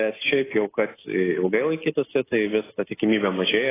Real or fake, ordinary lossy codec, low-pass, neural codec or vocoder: real; AAC, 16 kbps; 3.6 kHz; none